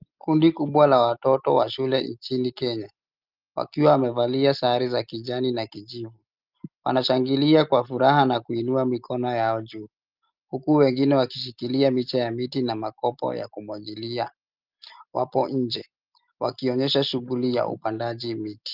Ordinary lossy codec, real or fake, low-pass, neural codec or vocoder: Opus, 24 kbps; real; 5.4 kHz; none